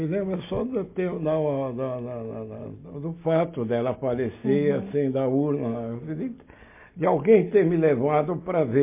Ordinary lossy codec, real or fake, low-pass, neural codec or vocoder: MP3, 24 kbps; real; 3.6 kHz; none